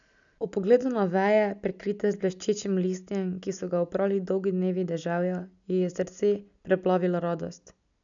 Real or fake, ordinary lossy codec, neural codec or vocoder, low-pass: real; none; none; 7.2 kHz